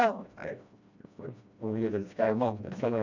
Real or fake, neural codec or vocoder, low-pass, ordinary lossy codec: fake; codec, 16 kHz, 1 kbps, FreqCodec, smaller model; 7.2 kHz; none